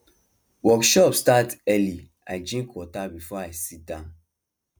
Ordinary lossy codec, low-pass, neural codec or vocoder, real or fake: none; none; none; real